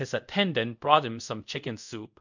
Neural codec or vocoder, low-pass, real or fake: codec, 24 kHz, 0.5 kbps, DualCodec; 7.2 kHz; fake